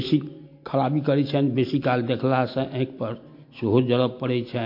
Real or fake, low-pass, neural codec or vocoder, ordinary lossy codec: real; 5.4 kHz; none; MP3, 32 kbps